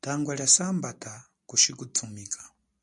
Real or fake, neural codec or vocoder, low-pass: real; none; 10.8 kHz